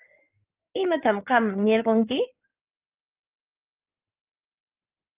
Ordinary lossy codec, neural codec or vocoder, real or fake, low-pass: Opus, 32 kbps; codec, 16 kHz in and 24 kHz out, 2.2 kbps, FireRedTTS-2 codec; fake; 3.6 kHz